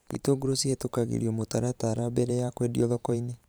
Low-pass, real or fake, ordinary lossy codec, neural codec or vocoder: none; fake; none; vocoder, 44.1 kHz, 128 mel bands every 512 samples, BigVGAN v2